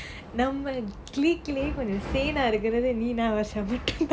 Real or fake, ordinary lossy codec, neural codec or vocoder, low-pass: real; none; none; none